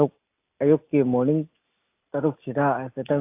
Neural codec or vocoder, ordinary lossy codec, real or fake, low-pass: none; AAC, 24 kbps; real; 3.6 kHz